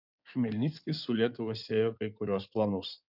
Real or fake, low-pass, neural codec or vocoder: fake; 5.4 kHz; codec, 16 kHz in and 24 kHz out, 2.2 kbps, FireRedTTS-2 codec